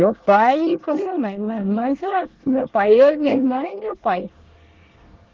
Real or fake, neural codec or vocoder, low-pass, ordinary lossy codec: fake; codec, 24 kHz, 1 kbps, SNAC; 7.2 kHz; Opus, 16 kbps